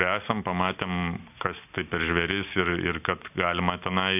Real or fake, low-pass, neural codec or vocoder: real; 3.6 kHz; none